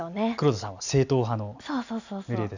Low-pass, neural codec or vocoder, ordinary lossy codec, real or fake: 7.2 kHz; none; none; real